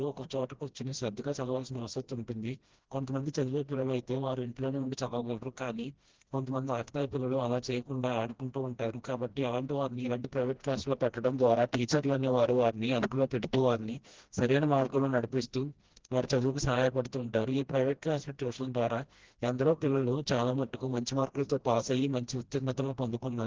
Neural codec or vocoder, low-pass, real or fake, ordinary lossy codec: codec, 16 kHz, 1 kbps, FreqCodec, smaller model; 7.2 kHz; fake; Opus, 16 kbps